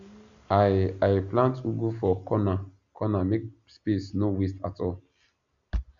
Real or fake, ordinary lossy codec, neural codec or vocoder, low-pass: real; none; none; 7.2 kHz